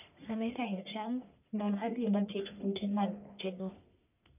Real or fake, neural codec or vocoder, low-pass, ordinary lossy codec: fake; codec, 44.1 kHz, 1.7 kbps, Pupu-Codec; 3.6 kHz; none